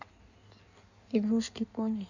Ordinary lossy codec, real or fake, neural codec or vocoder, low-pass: none; fake; codec, 16 kHz in and 24 kHz out, 1.1 kbps, FireRedTTS-2 codec; 7.2 kHz